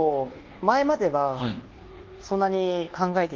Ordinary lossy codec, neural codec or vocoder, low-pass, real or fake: Opus, 16 kbps; codec, 24 kHz, 1.2 kbps, DualCodec; 7.2 kHz; fake